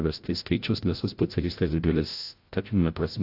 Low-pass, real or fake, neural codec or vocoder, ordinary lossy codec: 5.4 kHz; fake; codec, 16 kHz, 0.5 kbps, FreqCodec, larger model; AAC, 32 kbps